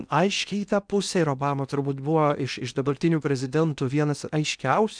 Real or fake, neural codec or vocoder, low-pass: fake; codec, 16 kHz in and 24 kHz out, 0.8 kbps, FocalCodec, streaming, 65536 codes; 9.9 kHz